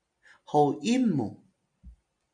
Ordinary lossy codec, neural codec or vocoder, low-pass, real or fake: MP3, 96 kbps; none; 9.9 kHz; real